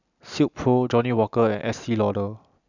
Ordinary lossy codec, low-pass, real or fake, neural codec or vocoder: none; 7.2 kHz; real; none